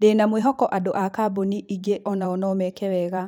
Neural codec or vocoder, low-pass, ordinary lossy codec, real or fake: vocoder, 44.1 kHz, 128 mel bands every 512 samples, BigVGAN v2; 19.8 kHz; none; fake